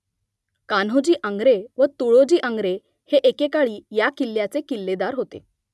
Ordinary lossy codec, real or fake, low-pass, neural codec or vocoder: none; real; none; none